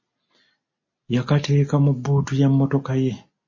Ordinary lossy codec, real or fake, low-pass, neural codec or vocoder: MP3, 32 kbps; real; 7.2 kHz; none